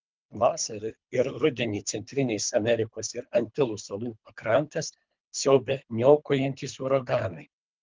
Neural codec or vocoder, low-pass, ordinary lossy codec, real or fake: codec, 24 kHz, 3 kbps, HILCodec; 7.2 kHz; Opus, 24 kbps; fake